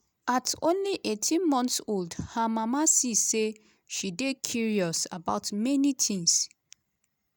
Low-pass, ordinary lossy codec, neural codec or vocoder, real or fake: none; none; none; real